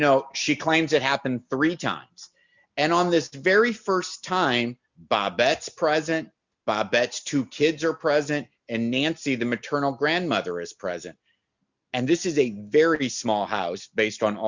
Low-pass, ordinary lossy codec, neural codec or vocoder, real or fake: 7.2 kHz; Opus, 64 kbps; none; real